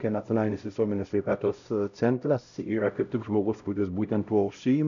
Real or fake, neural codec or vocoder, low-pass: fake; codec, 16 kHz, 0.5 kbps, X-Codec, HuBERT features, trained on LibriSpeech; 7.2 kHz